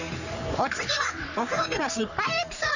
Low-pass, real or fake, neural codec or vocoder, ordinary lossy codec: 7.2 kHz; fake; codec, 44.1 kHz, 3.4 kbps, Pupu-Codec; none